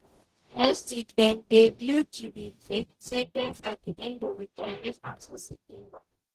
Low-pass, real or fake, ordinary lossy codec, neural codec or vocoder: 14.4 kHz; fake; Opus, 16 kbps; codec, 44.1 kHz, 0.9 kbps, DAC